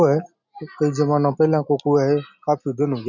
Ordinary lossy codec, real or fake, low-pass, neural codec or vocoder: none; real; 7.2 kHz; none